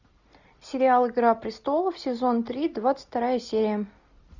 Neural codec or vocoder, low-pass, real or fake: none; 7.2 kHz; real